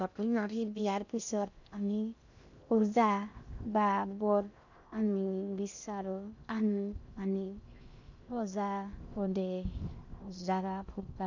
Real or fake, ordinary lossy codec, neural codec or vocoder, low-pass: fake; none; codec, 16 kHz in and 24 kHz out, 0.6 kbps, FocalCodec, streaming, 2048 codes; 7.2 kHz